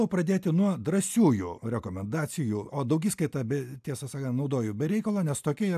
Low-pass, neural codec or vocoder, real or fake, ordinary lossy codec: 14.4 kHz; none; real; AAC, 96 kbps